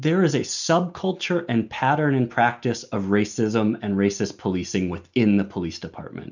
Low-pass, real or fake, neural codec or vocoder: 7.2 kHz; real; none